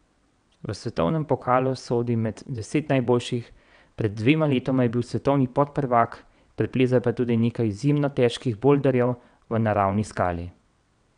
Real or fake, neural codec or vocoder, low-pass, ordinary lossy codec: fake; vocoder, 22.05 kHz, 80 mel bands, WaveNeXt; 9.9 kHz; none